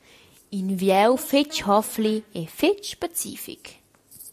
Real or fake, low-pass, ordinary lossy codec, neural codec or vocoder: real; 14.4 kHz; MP3, 64 kbps; none